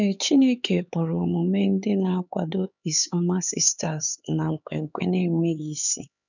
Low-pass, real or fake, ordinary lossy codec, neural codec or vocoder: 7.2 kHz; fake; none; codec, 16 kHz, 4 kbps, X-Codec, HuBERT features, trained on LibriSpeech